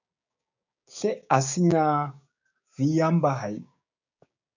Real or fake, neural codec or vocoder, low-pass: fake; codec, 16 kHz, 6 kbps, DAC; 7.2 kHz